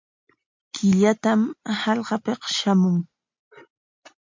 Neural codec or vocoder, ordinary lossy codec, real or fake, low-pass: none; MP3, 48 kbps; real; 7.2 kHz